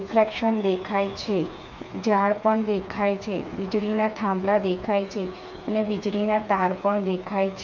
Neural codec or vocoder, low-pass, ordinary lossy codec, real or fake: codec, 16 kHz, 4 kbps, FreqCodec, smaller model; 7.2 kHz; none; fake